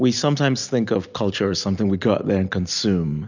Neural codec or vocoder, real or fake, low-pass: none; real; 7.2 kHz